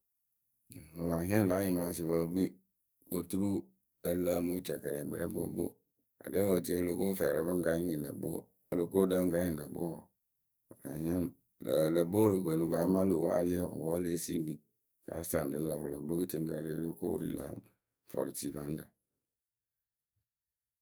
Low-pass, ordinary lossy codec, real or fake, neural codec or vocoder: none; none; fake; codec, 44.1 kHz, 2.6 kbps, SNAC